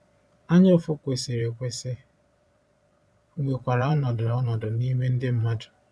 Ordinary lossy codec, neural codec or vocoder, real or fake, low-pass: none; vocoder, 22.05 kHz, 80 mel bands, Vocos; fake; none